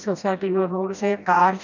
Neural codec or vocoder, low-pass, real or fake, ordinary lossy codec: codec, 16 kHz, 1 kbps, FreqCodec, smaller model; 7.2 kHz; fake; none